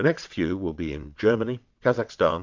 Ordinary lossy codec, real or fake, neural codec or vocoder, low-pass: MP3, 64 kbps; fake; vocoder, 22.05 kHz, 80 mel bands, WaveNeXt; 7.2 kHz